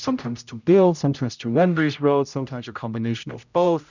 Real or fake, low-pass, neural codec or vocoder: fake; 7.2 kHz; codec, 16 kHz, 0.5 kbps, X-Codec, HuBERT features, trained on general audio